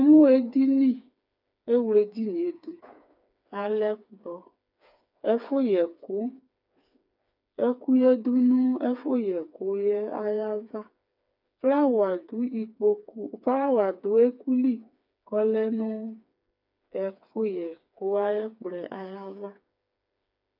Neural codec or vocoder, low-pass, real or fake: codec, 16 kHz, 4 kbps, FreqCodec, smaller model; 5.4 kHz; fake